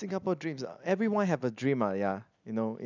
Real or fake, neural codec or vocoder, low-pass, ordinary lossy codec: real; none; 7.2 kHz; none